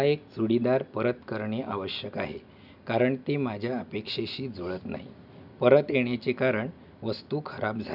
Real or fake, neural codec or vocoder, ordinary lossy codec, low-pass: real; none; none; 5.4 kHz